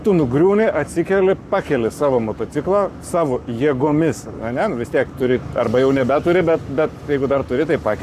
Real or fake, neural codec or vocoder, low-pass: fake; codec, 44.1 kHz, 7.8 kbps, Pupu-Codec; 14.4 kHz